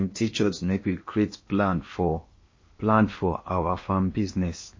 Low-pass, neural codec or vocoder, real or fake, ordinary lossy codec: 7.2 kHz; codec, 16 kHz in and 24 kHz out, 0.8 kbps, FocalCodec, streaming, 65536 codes; fake; MP3, 32 kbps